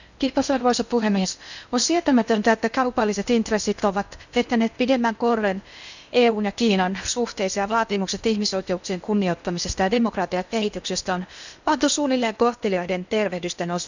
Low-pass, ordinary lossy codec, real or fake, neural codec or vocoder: 7.2 kHz; none; fake; codec, 16 kHz in and 24 kHz out, 0.8 kbps, FocalCodec, streaming, 65536 codes